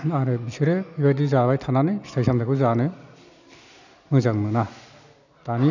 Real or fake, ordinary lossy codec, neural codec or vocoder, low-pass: real; none; none; 7.2 kHz